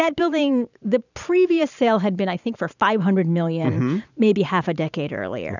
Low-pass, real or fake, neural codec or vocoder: 7.2 kHz; fake; vocoder, 44.1 kHz, 128 mel bands every 512 samples, BigVGAN v2